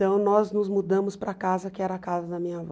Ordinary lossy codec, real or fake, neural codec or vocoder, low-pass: none; real; none; none